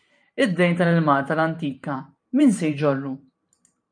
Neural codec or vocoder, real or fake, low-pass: vocoder, 44.1 kHz, 128 mel bands every 512 samples, BigVGAN v2; fake; 9.9 kHz